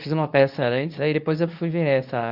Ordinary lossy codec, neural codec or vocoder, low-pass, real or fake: none; codec, 24 kHz, 0.9 kbps, WavTokenizer, medium speech release version 2; 5.4 kHz; fake